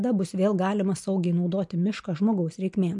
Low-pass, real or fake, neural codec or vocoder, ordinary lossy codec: 10.8 kHz; real; none; MP3, 64 kbps